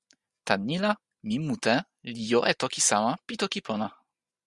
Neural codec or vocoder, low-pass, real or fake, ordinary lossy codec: none; 10.8 kHz; real; Opus, 64 kbps